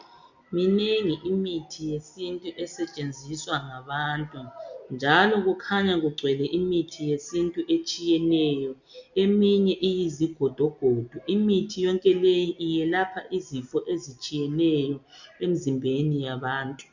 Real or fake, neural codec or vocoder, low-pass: real; none; 7.2 kHz